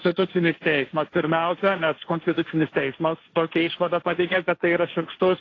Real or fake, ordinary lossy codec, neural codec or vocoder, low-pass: fake; AAC, 32 kbps; codec, 16 kHz, 1.1 kbps, Voila-Tokenizer; 7.2 kHz